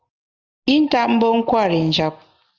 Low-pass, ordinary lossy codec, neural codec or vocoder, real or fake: 7.2 kHz; Opus, 32 kbps; none; real